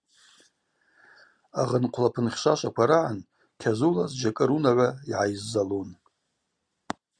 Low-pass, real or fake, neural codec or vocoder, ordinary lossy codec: 9.9 kHz; real; none; Opus, 64 kbps